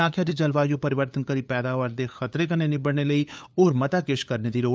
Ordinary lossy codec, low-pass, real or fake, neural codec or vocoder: none; none; fake; codec, 16 kHz, 8 kbps, FunCodec, trained on LibriTTS, 25 frames a second